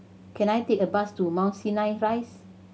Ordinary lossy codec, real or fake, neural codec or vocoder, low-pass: none; real; none; none